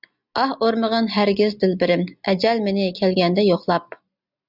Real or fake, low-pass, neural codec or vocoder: real; 5.4 kHz; none